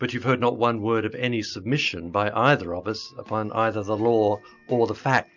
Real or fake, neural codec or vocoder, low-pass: real; none; 7.2 kHz